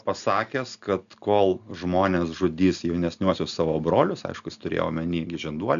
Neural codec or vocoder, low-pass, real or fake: none; 7.2 kHz; real